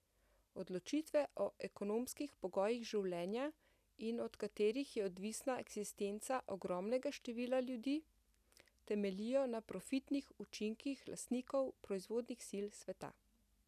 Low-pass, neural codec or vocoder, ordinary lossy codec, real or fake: 14.4 kHz; none; none; real